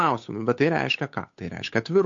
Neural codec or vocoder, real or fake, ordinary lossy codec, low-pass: codec, 16 kHz, 8 kbps, FunCodec, trained on Chinese and English, 25 frames a second; fake; MP3, 48 kbps; 7.2 kHz